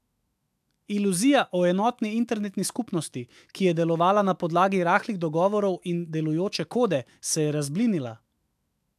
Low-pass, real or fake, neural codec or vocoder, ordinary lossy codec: 14.4 kHz; fake; autoencoder, 48 kHz, 128 numbers a frame, DAC-VAE, trained on Japanese speech; AAC, 96 kbps